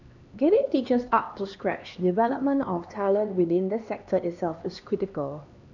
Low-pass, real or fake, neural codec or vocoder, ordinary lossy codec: 7.2 kHz; fake; codec, 16 kHz, 2 kbps, X-Codec, HuBERT features, trained on LibriSpeech; none